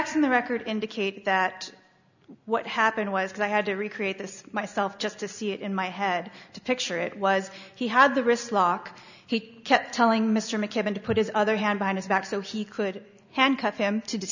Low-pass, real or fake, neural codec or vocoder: 7.2 kHz; real; none